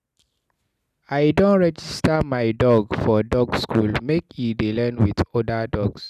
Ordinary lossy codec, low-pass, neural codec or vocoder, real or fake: none; 14.4 kHz; none; real